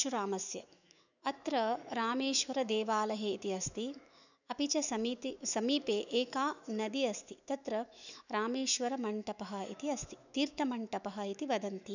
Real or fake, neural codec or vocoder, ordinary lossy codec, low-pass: fake; autoencoder, 48 kHz, 128 numbers a frame, DAC-VAE, trained on Japanese speech; none; 7.2 kHz